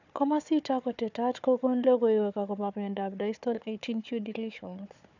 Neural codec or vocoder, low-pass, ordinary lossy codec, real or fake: codec, 16 kHz, 4 kbps, FunCodec, trained on Chinese and English, 50 frames a second; 7.2 kHz; none; fake